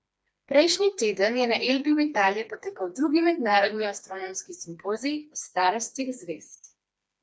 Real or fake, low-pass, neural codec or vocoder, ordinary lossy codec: fake; none; codec, 16 kHz, 2 kbps, FreqCodec, smaller model; none